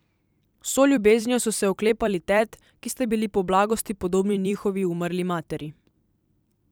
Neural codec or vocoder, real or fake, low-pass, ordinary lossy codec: vocoder, 44.1 kHz, 128 mel bands every 256 samples, BigVGAN v2; fake; none; none